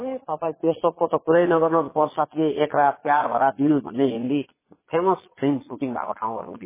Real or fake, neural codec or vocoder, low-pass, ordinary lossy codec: fake; vocoder, 22.05 kHz, 80 mel bands, Vocos; 3.6 kHz; MP3, 16 kbps